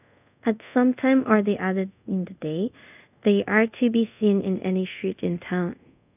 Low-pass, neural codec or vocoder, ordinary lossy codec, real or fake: 3.6 kHz; codec, 24 kHz, 0.5 kbps, DualCodec; none; fake